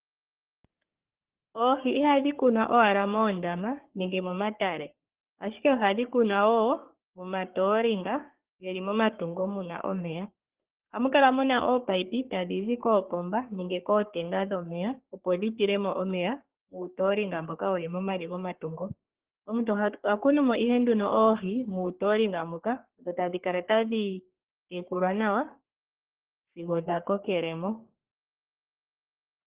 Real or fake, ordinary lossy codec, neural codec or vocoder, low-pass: fake; Opus, 16 kbps; codec, 44.1 kHz, 3.4 kbps, Pupu-Codec; 3.6 kHz